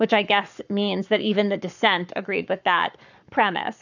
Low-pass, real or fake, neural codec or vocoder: 7.2 kHz; fake; codec, 44.1 kHz, 7.8 kbps, Pupu-Codec